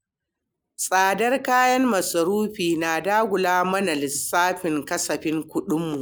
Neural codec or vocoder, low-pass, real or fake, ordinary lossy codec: none; none; real; none